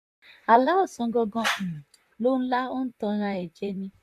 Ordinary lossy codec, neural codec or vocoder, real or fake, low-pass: AAC, 96 kbps; vocoder, 44.1 kHz, 128 mel bands, Pupu-Vocoder; fake; 14.4 kHz